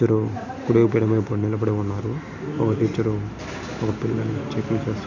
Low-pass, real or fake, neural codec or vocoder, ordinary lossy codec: 7.2 kHz; real; none; none